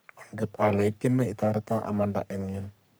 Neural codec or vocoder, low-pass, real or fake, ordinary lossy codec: codec, 44.1 kHz, 3.4 kbps, Pupu-Codec; none; fake; none